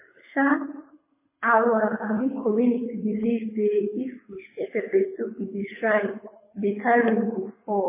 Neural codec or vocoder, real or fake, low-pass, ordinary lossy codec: codec, 24 kHz, 6 kbps, HILCodec; fake; 3.6 kHz; MP3, 16 kbps